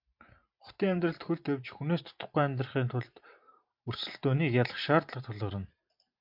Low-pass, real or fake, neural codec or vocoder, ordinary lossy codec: 5.4 kHz; fake; vocoder, 44.1 kHz, 128 mel bands every 512 samples, BigVGAN v2; MP3, 48 kbps